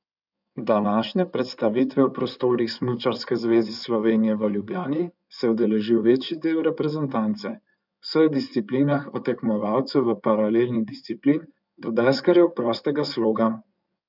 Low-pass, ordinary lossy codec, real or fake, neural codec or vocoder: 5.4 kHz; none; fake; codec, 16 kHz in and 24 kHz out, 2.2 kbps, FireRedTTS-2 codec